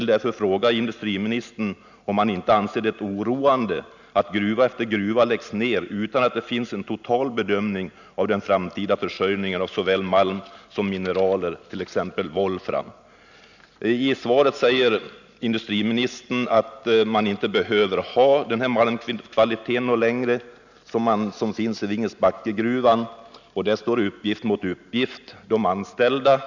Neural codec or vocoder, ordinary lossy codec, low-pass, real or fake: none; none; 7.2 kHz; real